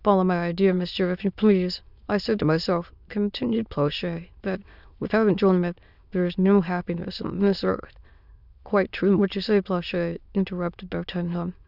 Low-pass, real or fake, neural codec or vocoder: 5.4 kHz; fake; autoencoder, 22.05 kHz, a latent of 192 numbers a frame, VITS, trained on many speakers